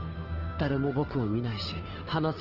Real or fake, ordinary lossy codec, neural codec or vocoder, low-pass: fake; Opus, 16 kbps; codec, 16 kHz, 2 kbps, FunCodec, trained on Chinese and English, 25 frames a second; 5.4 kHz